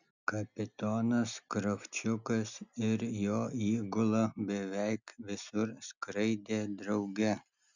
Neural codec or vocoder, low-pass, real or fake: none; 7.2 kHz; real